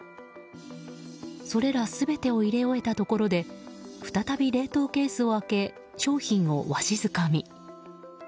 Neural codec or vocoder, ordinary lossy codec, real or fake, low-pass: none; none; real; none